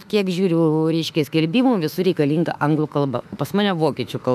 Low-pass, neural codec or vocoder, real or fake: 14.4 kHz; autoencoder, 48 kHz, 32 numbers a frame, DAC-VAE, trained on Japanese speech; fake